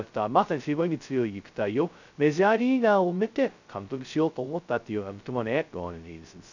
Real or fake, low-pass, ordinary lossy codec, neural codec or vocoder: fake; 7.2 kHz; none; codec, 16 kHz, 0.2 kbps, FocalCodec